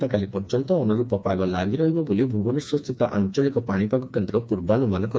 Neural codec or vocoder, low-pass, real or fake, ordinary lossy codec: codec, 16 kHz, 2 kbps, FreqCodec, smaller model; none; fake; none